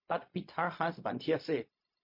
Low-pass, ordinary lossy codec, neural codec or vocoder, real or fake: 5.4 kHz; MP3, 32 kbps; codec, 16 kHz, 0.4 kbps, LongCat-Audio-Codec; fake